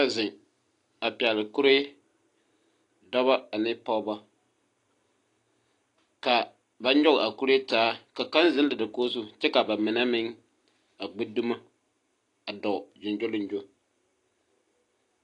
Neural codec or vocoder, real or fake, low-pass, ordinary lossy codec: none; real; 10.8 kHz; AAC, 48 kbps